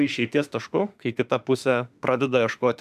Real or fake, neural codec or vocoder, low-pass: fake; autoencoder, 48 kHz, 32 numbers a frame, DAC-VAE, trained on Japanese speech; 14.4 kHz